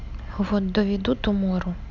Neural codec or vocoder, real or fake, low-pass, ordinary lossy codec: none; real; 7.2 kHz; none